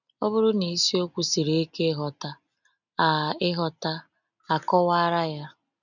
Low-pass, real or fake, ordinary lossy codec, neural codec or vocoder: 7.2 kHz; real; none; none